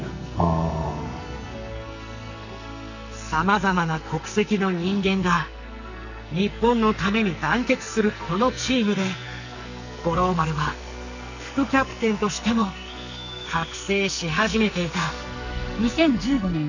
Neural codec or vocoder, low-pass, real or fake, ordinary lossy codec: codec, 44.1 kHz, 2.6 kbps, SNAC; 7.2 kHz; fake; none